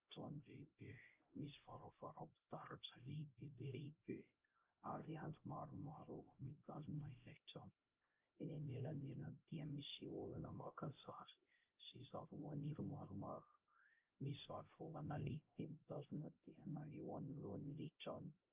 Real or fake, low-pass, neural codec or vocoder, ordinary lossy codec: fake; 3.6 kHz; codec, 16 kHz, 0.5 kbps, X-Codec, HuBERT features, trained on LibriSpeech; Opus, 24 kbps